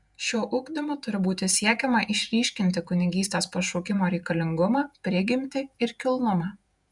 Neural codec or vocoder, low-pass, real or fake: vocoder, 48 kHz, 128 mel bands, Vocos; 10.8 kHz; fake